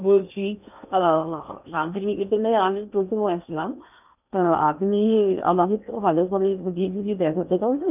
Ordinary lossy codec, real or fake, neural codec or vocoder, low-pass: none; fake; codec, 16 kHz in and 24 kHz out, 0.8 kbps, FocalCodec, streaming, 65536 codes; 3.6 kHz